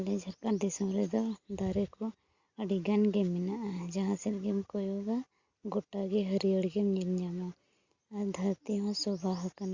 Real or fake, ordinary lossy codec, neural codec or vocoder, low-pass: real; Opus, 64 kbps; none; 7.2 kHz